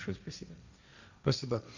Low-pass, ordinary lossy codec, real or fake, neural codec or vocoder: 7.2 kHz; none; fake; codec, 16 kHz, 1.1 kbps, Voila-Tokenizer